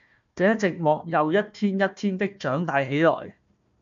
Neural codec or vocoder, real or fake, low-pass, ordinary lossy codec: codec, 16 kHz, 1 kbps, FunCodec, trained on Chinese and English, 50 frames a second; fake; 7.2 kHz; MP3, 64 kbps